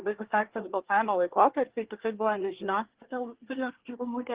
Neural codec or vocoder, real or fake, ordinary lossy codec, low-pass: codec, 24 kHz, 1 kbps, SNAC; fake; Opus, 16 kbps; 3.6 kHz